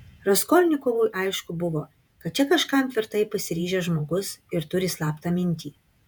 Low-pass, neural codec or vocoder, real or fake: 19.8 kHz; vocoder, 48 kHz, 128 mel bands, Vocos; fake